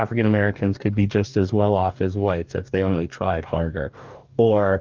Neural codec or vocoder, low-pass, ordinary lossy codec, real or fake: codec, 44.1 kHz, 2.6 kbps, DAC; 7.2 kHz; Opus, 24 kbps; fake